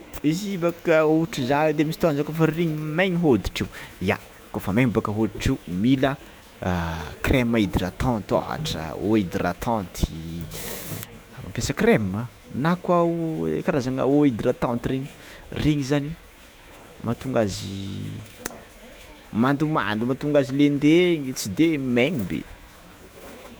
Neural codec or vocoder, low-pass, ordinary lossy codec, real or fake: autoencoder, 48 kHz, 128 numbers a frame, DAC-VAE, trained on Japanese speech; none; none; fake